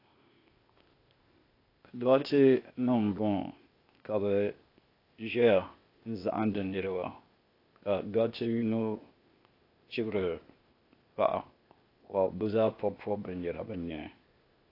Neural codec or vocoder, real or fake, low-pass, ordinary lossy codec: codec, 16 kHz, 0.8 kbps, ZipCodec; fake; 5.4 kHz; AAC, 32 kbps